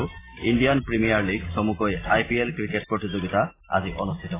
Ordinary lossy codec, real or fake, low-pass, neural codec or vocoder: AAC, 16 kbps; real; 3.6 kHz; none